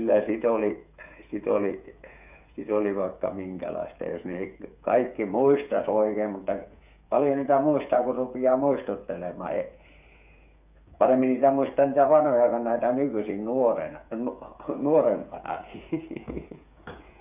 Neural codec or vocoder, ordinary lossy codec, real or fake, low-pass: codec, 16 kHz, 8 kbps, FreqCodec, smaller model; none; fake; 3.6 kHz